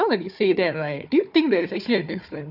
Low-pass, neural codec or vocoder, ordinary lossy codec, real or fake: 5.4 kHz; codec, 16 kHz, 4 kbps, FunCodec, trained on Chinese and English, 50 frames a second; none; fake